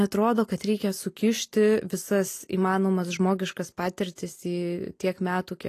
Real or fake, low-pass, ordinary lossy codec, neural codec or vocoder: real; 14.4 kHz; AAC, 48 kbps; none